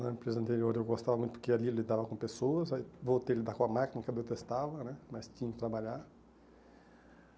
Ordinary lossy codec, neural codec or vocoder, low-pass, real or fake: none; none; none; real